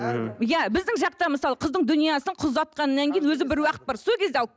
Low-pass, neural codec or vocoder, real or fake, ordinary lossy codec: none; none; real; none